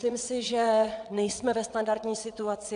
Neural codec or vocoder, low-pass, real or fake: vocoder, 22.05 kHz, 80 mel bands, WaveNeXt; 9.9 kHz; fake